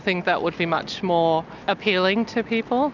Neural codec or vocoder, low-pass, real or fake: none; 7.2 kHz; real